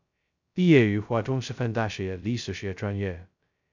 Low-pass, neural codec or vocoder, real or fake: 7.2 kHz; codec, 16 kHz, 0.2 kbps, FocalCodec; fake